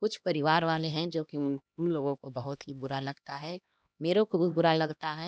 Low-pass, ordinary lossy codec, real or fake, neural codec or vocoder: none; none; fake; codec, 16 kHz, 1 kbps, X-Codec, HuBERT features, trained on LibriSpeech